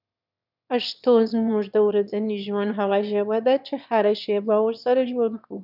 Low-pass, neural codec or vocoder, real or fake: 5.4 kHz; autoencoder, 22.05 kHz, a latent of 192 numbers a frame, VITS, trained on one speaker; fake